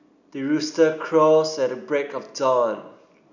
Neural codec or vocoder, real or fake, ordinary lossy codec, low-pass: none; real; none; 7.2 kHz